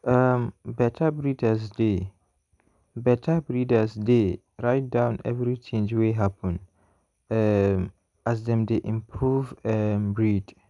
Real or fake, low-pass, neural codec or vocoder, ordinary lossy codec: real; 10.8 kHz; none; none